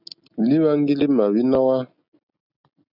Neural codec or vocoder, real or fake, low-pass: none; real; 5.4 kHz